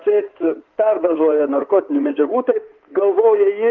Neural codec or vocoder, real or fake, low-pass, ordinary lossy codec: codec, 16 kHz in and 24 kHz out, 2.2 kbps, FireRedTTS-2 codec; fake; 7.2 kHz; Opus, 24 kbps